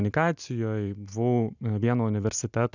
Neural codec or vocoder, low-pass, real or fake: none; 7.2 kHz; real